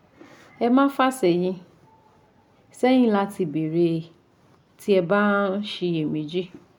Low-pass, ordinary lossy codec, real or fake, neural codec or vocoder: 19.8 kHz; none; real; none